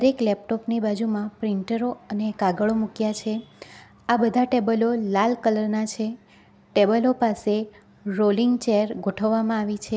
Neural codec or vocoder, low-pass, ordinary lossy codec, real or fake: none; none; none; real